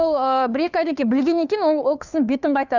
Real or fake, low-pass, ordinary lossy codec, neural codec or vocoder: fake; 7.2 kHz; none; codec, 16 kHz, 2 kbps, FunCodec, trained on Chinese and English, 25 frames a second